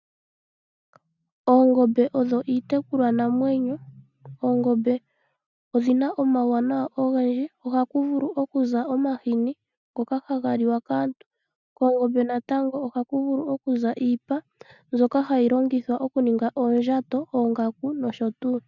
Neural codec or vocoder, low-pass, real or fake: none; 7.2 kHz; real